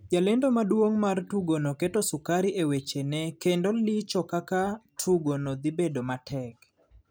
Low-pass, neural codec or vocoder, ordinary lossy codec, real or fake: none; none; none; real